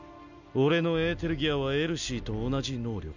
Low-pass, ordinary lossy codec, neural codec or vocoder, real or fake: 7.2 kHz; none; none; real